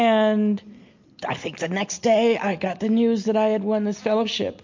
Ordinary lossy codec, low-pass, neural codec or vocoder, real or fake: MP3, 48 kbps; 7.2 kHz; none; real